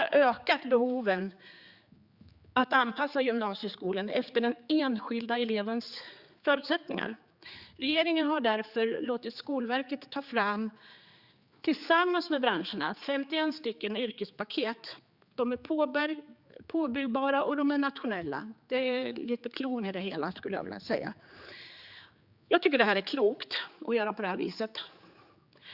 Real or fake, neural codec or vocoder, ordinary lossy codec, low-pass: fake; codec, 16 kHz, 4 kbps, X-Codec, HuBERT features, trained on general audio; Opus, 64 kbps; 5.4 kHz